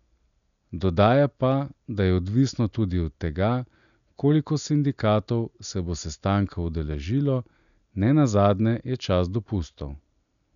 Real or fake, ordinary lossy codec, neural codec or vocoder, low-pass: real; none; none; 7.2 kHz